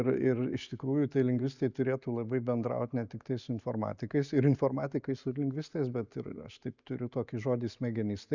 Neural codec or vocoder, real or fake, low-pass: none; real; 7.2 kHz